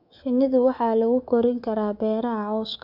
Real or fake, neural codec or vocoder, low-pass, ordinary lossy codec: fake; codec, 24 kHz, 3.1 kbps, DualCodec; 5.4 kHz; none